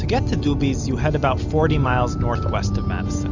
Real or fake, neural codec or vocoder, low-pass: real; none; 7.2 kHz